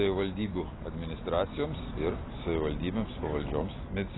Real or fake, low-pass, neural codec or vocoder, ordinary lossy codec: real; 7.2 kHz; none; AAC, 16 kbps